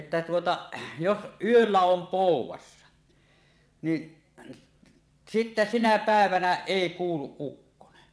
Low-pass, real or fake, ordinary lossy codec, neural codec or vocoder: none; fake; none; vocoder, 22.05 kHz, 80 mel bands, WaveNeXt